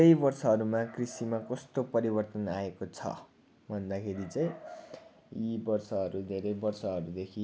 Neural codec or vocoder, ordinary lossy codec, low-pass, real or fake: none; none; none; real